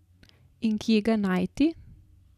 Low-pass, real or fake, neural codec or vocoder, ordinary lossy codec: 14.4 kHz; real; none; none